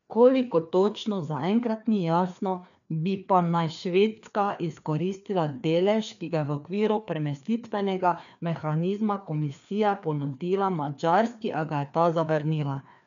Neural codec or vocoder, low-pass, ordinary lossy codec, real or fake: codec, 16 kHz, 2 kbps, FreqCodec, larger model; 7.2 kHz; none; fake